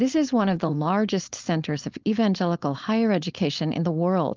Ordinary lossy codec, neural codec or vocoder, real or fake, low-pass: Opus, 32 kbps; codec, 16 kHz, 4.8 kbps, FACodec; fake; 7.2 kHz